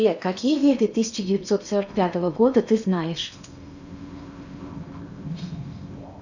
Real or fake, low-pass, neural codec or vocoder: fake; 7.2 kHz; codec, 16 kHz in and 24 kHz out, 0.8 kbps, FocalCodec, streaming, 65536 codes